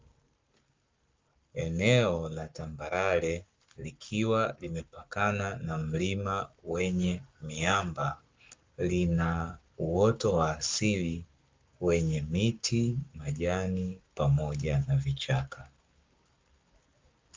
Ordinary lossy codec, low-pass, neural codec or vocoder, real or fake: Opus, 24 kbps; 7.2 kHz; codec, 44.1 kHz, 7.8 kbps, Pupu-Codec; fake